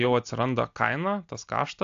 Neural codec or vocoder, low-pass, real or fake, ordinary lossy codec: none; 7.2 kHz; real; AAC, 64 kbps